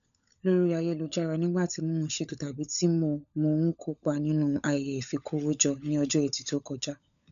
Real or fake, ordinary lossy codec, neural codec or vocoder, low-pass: fake; none; codec, 16 kHz, 16 kbps, FunCodec, trained on LibriTTS, 50 frames a second; 7.2 kHz